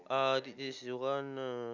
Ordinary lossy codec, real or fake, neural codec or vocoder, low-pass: none; real; none; 7.2 kHz